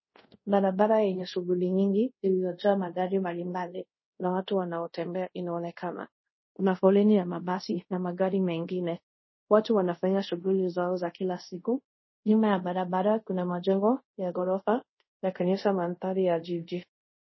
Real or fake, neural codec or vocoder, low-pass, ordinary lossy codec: fake; codec, 24 kHz, 0.5 kbps, DualCodec; 7.2 kHz; MP3, 24 kbps